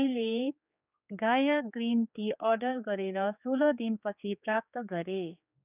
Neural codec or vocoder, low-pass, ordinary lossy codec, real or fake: codec, 16 kHz, 4 kbps, X-Codec, HuBERT features, trained on general audio; 3.6 kHz; none; fake